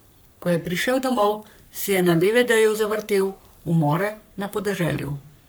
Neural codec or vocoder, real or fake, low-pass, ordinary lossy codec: codec, 44.1 kHz, 3.4 kbps, Pupu-Codec; fake; none; none